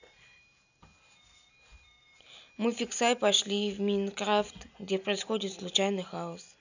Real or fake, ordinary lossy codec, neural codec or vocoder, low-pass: real; none; none; 7.2 kHz